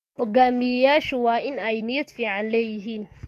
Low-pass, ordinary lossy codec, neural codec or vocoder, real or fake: 14.4 kHz; none; codec, 44.1 kHz, 7.8 kbps, DAC; fake